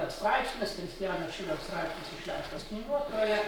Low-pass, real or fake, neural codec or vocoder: 19.8 kHz; fake; codec, 44.1 kHz, 7.8 kbps, Pupu-Codec